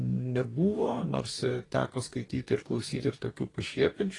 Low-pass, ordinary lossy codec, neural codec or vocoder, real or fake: 10.8 kHz; AAC, 32 kbps; codec, 44.1 kHz, 2.6 kbps, DAC; fake